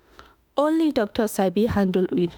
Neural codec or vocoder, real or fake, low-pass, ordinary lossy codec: autoencoder, 48 kHz, 32 numbers a frame, DAC-VAE, trained on Japanese speech; fake; none; none